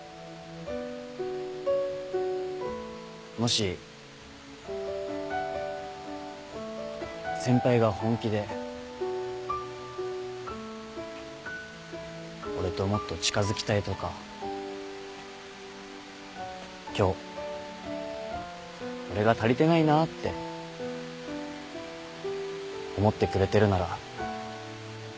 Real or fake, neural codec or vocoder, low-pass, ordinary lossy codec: real; none; none; none